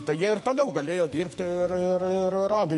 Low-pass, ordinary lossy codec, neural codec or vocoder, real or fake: 14.4 kHz; MP3, 48 kbps; codec, 44.1 kHz, 2.6 kbps, SNAC; fake